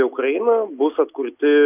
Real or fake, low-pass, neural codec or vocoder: real; 3.6 kHz; none